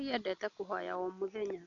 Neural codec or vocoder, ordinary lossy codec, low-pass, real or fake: none; none; 7.2 kHz; real